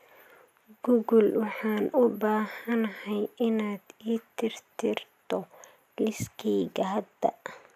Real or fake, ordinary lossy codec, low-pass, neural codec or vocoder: real; none; 14.4 kHz; none